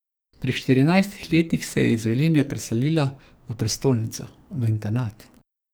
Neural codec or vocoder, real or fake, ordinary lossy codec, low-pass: codec, 44.1 kHz, 2.6 kbps, SNAC; fake; none; none